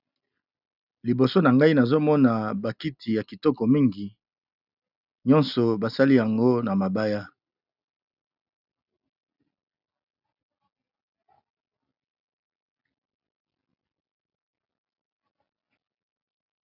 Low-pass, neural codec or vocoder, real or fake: 5.4 kHz; none; real